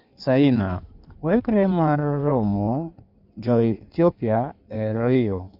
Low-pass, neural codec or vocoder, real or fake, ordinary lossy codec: 5.4 kHz; codec, 16 kHz in and 24 kHz out, 1.1 kbps, FireRedTTS-2 codec; fake; none